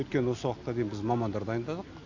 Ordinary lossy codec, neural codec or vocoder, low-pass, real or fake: AAC, 48 kbps; none; 7.2 kHz; real